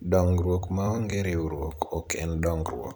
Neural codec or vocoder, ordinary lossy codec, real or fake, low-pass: vocoder, 44.1 kHz, 128 mel bands every 256 samples, BigVGAN v2; none; fake; none